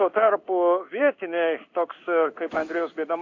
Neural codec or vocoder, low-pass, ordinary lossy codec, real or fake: codec, 16 kHz in and 24 kHz out, 1 kbps, XY-Tokenizer; 7.2 kHz; AAC, 48 kbps; fake